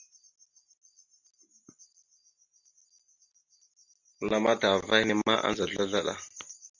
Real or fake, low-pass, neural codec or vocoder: real; 7.2 kHz; none